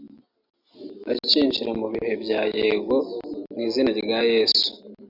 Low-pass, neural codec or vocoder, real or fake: 5.4 kHz; none; real